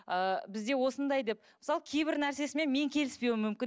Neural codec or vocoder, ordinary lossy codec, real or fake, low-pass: none; none; real; none